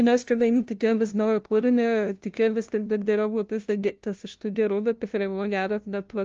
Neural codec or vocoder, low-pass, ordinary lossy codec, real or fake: codec, 16 kHz, 0.5 kbps, FunCodec, trained on LibriTTS, 25 frames a second; 7.2 kHz; Opus, 24 kbps; fake